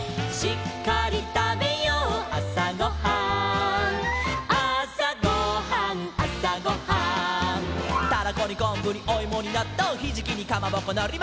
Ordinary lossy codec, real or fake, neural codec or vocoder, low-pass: none; real; none; none